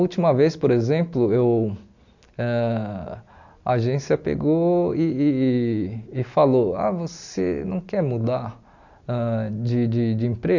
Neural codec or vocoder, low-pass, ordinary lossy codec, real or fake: none; 7.2 kHz; none; real